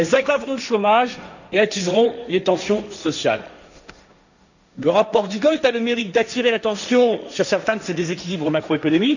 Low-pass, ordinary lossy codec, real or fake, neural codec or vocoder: 7.2 kHz; none; fake; codec, 16 kHz, 1.1 kbps, Voila-Tokenizer